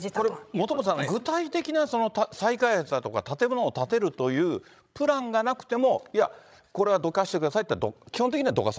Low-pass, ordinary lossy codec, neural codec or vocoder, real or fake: none; none; codec, 16 kHz, 16 kbps, FreqCodec, larger model; fake